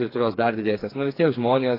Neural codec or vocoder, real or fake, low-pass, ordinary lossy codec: codec, 16 kHz, 4 kbps, FreqCodec, smaller model; fake; 5.4 kHz; AAC, 24 kbps